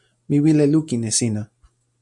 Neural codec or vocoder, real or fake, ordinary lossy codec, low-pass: none; real; MP3, 64 kbps; 10.8 kHz